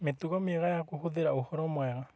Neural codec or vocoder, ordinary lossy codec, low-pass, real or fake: none; none; none; real